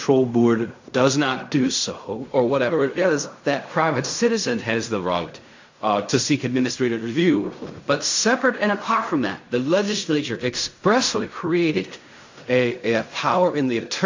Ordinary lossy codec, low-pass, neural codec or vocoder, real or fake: AAC, 48 kbps; 7.2 kHz; codec, 16 kHz in and 24 kHz out, 0.4 kbps, LongCat-Audio-Codec, fine tuned four codebook decoder; fake